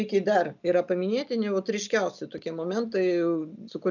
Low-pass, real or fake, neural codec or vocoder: 7.2 kHz; real; none